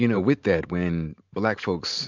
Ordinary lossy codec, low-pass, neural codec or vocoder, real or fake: MP3, 64 kbps; 7.2 kHz; none; real